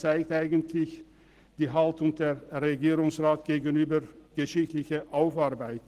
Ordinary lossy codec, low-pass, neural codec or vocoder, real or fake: Opus, 16 kbps; 14.4 kHz; none; real